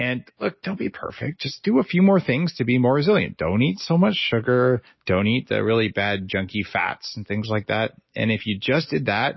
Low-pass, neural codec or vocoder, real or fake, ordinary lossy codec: 7.2 kHz; none; real; MP3, 24 kbps